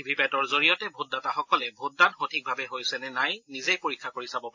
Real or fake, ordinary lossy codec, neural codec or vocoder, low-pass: real; AAC, 48 kbps; none; 7.2 kHz